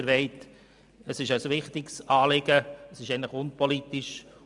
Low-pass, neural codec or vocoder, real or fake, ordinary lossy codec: 10.8 kHz; none; real; none